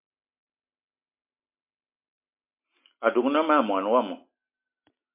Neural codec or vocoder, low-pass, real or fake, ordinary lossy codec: none; 3.6 kHz; real; MP3, 32 kbps